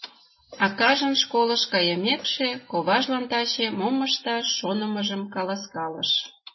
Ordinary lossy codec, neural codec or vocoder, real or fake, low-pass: MP3, 24 kbps; none; real; 7.2 kHz